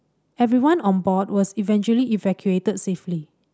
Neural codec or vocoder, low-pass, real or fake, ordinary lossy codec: none; none; real; none